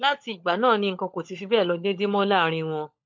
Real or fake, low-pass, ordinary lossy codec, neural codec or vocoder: fake; 7.2 kHz; MP3, 48 kbps; codec, 16 kHz, 16 kbps, FunCodec, trained on LibriTTS, 50 frames a second